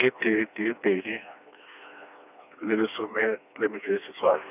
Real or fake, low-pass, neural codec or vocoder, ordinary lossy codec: fake; 3.6 kHz; codec, 16 kHz, 2 kbps, FreqCodec, smaller model; none